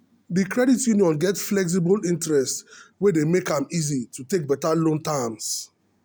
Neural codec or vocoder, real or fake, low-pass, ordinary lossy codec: none; real; none; none